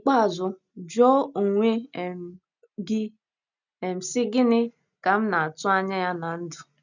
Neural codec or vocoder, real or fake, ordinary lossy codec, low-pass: none; real; none; 7.2 kHz